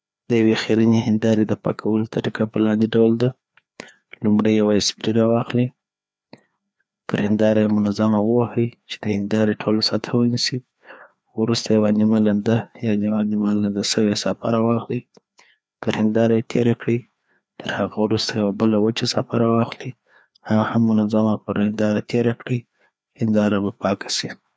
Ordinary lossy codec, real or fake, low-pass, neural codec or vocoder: none; fake; none; codec, 16 kHz, 2 kbps, FreqCodec, larger model